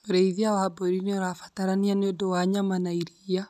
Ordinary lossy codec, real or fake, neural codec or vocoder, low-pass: none; real; none; 14.4 kHz